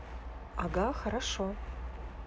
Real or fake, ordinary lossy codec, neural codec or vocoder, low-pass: real; none; none; none